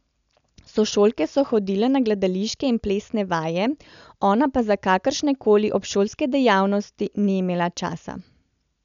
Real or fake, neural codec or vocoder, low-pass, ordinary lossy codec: real; none; 7.2 kHz; none